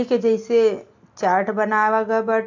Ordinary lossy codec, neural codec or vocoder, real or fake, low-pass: AAC, 48 kbps; none; real; 7.2 kHz